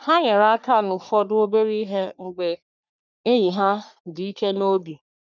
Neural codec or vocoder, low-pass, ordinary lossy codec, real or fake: codec, 44.1 kHz, 3.4 kbps, Pupu-Codec; 7.2 kHz; none; fake